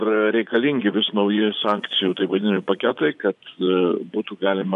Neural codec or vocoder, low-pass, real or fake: vocoder, 24 kHz, 100 mel bands, Vocos; 10.8 kHz; fake